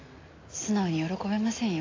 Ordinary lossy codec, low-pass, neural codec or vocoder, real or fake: AAC, 32 kbps; 7.2 kHz; none; real